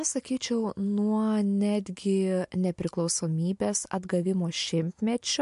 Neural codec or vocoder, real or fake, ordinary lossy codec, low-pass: none; real; MP3, 64 kbps; 10.8 kHz